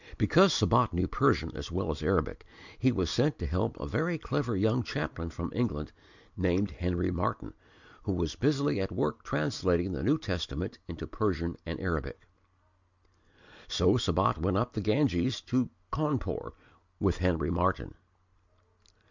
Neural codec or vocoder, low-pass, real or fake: none; 7.2 kHz; real